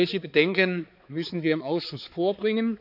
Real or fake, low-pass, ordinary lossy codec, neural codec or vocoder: fake; 5.4 kHz; none; codec, 16 kHz, 4 kbps, X-Codec, HuBERT features, trained on balanced general audio